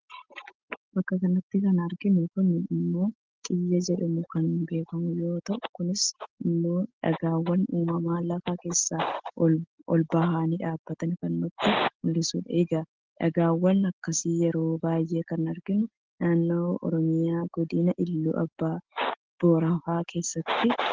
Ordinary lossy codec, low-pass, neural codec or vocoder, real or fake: Opus, 16 kbps; 7.2 kHz; none; real